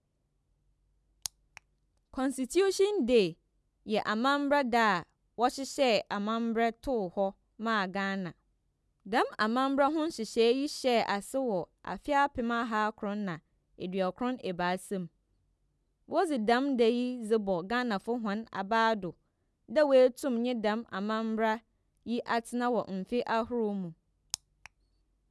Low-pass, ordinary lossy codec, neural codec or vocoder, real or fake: none; none; none; real